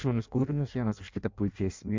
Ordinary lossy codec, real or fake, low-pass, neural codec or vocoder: MP3, 64 kbps; fake; 7.2 kHz; codec, 16 kHz in and 24 kHz out, 0.6 kbps, FireRedTTS-2 codec